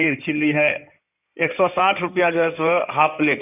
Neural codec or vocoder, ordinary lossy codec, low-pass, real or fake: codec, 16 kHz in and 24 kHz out, 2.2 kbps, FireRedTTS-2 codec; none; 3.6 kHz; fake